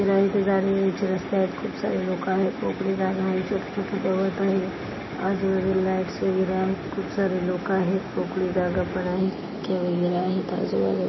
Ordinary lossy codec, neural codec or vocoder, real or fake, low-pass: MP3, 24 kbps; none; real; 7.2 kHz